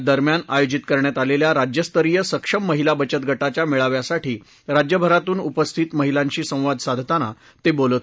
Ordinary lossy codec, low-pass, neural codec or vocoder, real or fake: none; none; none; real